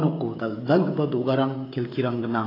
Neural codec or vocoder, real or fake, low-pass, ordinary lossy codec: codec, 16 kHz, 16 kbps, FreqCodec, smaller model; fake; 5.4 kHz; AAC, 24 kbps